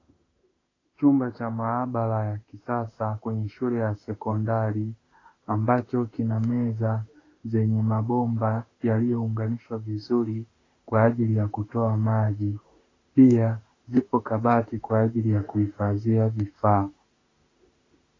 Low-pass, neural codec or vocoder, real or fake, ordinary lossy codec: 7.2 kHz; autoencoder, 48 kHz, 32 numbers a frame, DAC-VAE, trained on Japanese speech; fake; AAC, 32 kbps